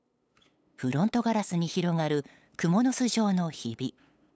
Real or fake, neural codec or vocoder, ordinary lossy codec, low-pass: fake; codec, 16 kHz, 8 kbps, FunCodec, trained on LibriTTS, 25 frames a second; none; none